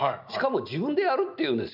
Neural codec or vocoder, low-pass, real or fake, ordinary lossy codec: none; 5.4 kHz; real; none